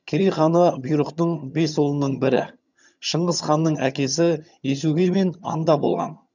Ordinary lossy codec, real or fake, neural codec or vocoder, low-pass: none; fake; vocoder, 22.05 kHz, 80 mel bands, HiFi-GAN; 7.2 kHz